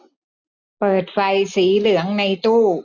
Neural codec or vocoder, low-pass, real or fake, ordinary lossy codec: none; 7.2 kHz; real; none